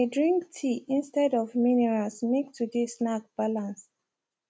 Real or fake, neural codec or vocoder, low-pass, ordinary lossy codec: real; none; none; none